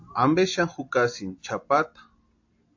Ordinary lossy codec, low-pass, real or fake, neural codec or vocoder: AAC, 48 kbps; 7.2 kHz; real; none